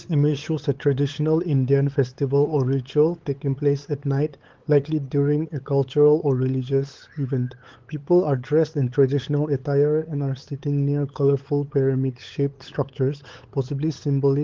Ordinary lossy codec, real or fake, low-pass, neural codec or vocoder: Opus, 16 kbps; fake; 7.2 kHz; codec, 16 kHz, 8 kbps, FunCodec, trained on LibriTTS, 25 frames a second